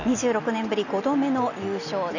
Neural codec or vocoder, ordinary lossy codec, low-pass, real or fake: none; none; 7.2 kHz; real